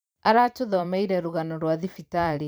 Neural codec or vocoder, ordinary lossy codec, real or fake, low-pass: vocoder, 44.1 kHz, 128 mel bands every 512 samples, BigVGAN v2; none; fake; none